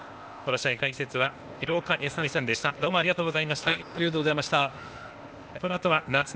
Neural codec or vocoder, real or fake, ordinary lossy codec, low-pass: codec, 16 kHz, 0.8 kbps, ZipCodec; fake; none; none